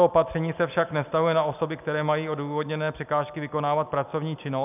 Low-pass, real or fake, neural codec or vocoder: 3.6 kHz; real; none